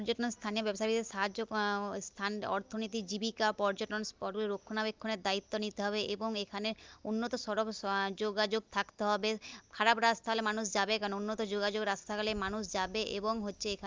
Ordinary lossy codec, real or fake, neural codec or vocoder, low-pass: Opus, 32 kbps; real; none; 7.2 kHz